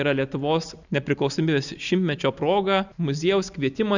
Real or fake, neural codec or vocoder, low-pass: real; none; 7.2 kHz